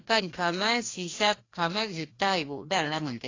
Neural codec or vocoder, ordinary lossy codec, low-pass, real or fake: codec, 16 kHz, 1 kbps, FreqCodec, larger model; AAC, 32 kbps; 7.2 kHz; fake